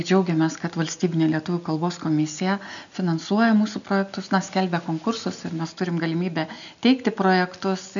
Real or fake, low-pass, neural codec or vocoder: real; 7.2 kHz; none